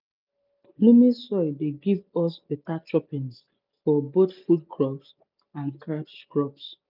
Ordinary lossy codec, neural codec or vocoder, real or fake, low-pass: none; none; real; 5.4 kHz